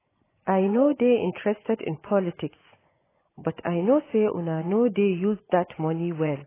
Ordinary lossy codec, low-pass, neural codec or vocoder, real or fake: AAC, 16 kbps; 3.6 kHz; vocoder, 24 kHz, 100 mel bands, Vocos; fake